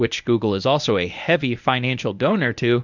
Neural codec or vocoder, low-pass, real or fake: codec, 24 kHz, 0.9 kbps, WavTokenizer, medium speech release version 1; 7.2 kHz; fake